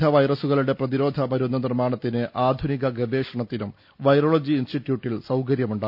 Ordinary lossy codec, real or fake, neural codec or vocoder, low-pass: none; real; none; 5.4 kHz